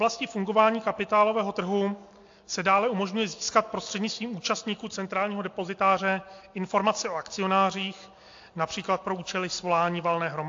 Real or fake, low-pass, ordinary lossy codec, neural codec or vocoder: real; 7.2 kHz; AAC, 48 kbps; none